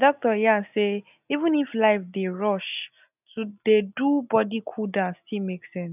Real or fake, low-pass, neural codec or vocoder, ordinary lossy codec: real; 3.6 kHz; none; none